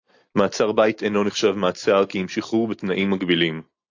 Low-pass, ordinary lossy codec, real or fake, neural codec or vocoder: 7.2 kHz; AAC, 48 kbps; real; none